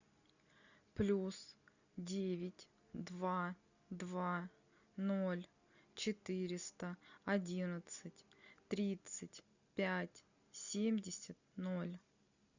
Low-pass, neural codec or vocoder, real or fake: 7.2 kHz; none; real